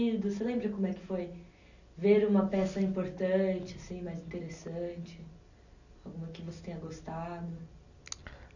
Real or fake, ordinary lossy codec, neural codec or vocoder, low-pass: real; none; none; 7.2 kHz